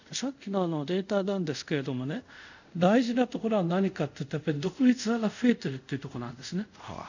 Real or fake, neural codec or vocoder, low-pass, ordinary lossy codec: fake; codec, 24 kHz, 0.5 kbps, DualCodec; 7.2 kHz; none